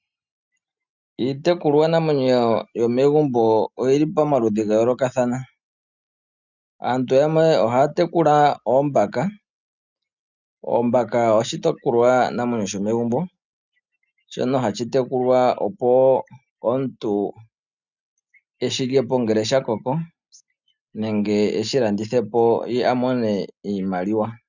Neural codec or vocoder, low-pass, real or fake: none; 7.2 kHz; real